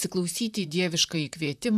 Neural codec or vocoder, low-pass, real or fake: vocoder, 44.1 kHz, 128 mel bands, Pupu-Vocoder; 14.4 kHz; fake